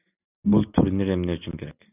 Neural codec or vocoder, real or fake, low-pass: none; real; 3.6 kHz